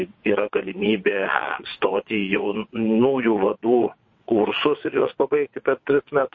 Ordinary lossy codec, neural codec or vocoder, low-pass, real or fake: MP3, 32 kbps; vocoder, 22.05 kHz, 80 mel bands, WaveNeXt; 7.2 kHz; fake